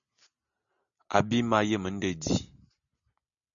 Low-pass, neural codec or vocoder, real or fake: 7.2 kHz; none; real